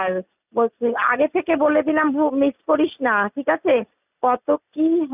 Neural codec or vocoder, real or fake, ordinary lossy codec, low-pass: none; real; none; 3.6 kHz